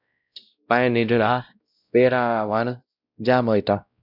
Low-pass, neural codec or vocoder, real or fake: 5.4 kHz; codec, 16 kHz, 0.5 kbps, X-Codec, WavLM features, trained on Multilingual LibriSpeech; fake